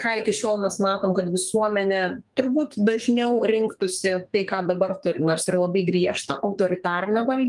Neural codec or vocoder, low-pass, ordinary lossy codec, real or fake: codec, 32 kHz, 1.9 kbps, SNAC; 10.8 kHz; Opus, 32 kbps; fake